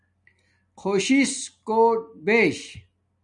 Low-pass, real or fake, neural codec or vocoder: 10.8 kHz; real; none